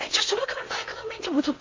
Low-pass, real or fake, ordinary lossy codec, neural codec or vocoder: 7.2 kHz; fake; MP3, 32 kbps; codec, 16 kHz in and 24 kHz out, 0.8 kbps, FocalCodec, streaming, 65536 codes